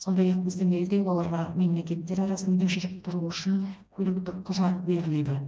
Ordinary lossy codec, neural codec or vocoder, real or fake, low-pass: none; codec, 16 kHz, 1 kbps, FreqCodec, smaller model; fake; none